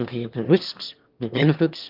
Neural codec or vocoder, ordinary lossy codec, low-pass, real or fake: autoencoder, 22.05 kHz, a latent of 192 numbers a frame, VITS, trained on one speaker; Opus, 24 kbps; 5.4 kHz; fake